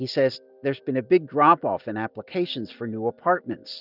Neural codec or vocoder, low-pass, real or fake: none; 5.4 kHz; real